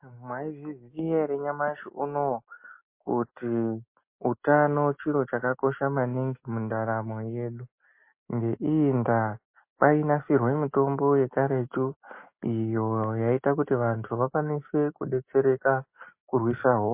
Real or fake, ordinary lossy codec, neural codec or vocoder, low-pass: real; MP3, 24 kbps; none; 3.6 kHz